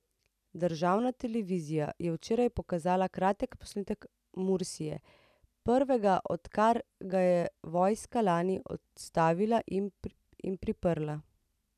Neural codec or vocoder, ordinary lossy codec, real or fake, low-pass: none; none; real; 14.4 kHz